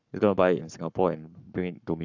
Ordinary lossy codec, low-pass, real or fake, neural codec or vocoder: none; 7.2 kHz; fake; codec, 44.1 kHz, 7.8 kbps, Pupu-Codec